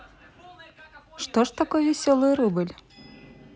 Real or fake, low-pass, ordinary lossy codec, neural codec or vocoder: real; none; none; none